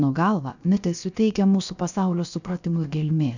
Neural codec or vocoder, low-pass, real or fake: codec, 16 kHz, 0.7 kbps, FocalCodec; 7.2 kHz; fake